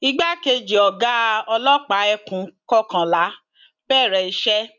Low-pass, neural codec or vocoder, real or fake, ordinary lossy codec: 7.2 kHz; none; real; none